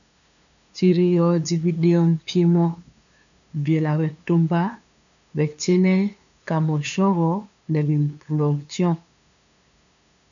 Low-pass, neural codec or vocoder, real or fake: 7.2 kHz; codec, 16 kHz, 2 kbps, FunCodec, trained on LibriTTS, 25 frames a second; fake